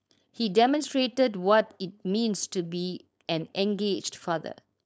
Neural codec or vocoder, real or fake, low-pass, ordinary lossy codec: codec, 16 kHz, 4.8 kbps, FACodec; fake; none; none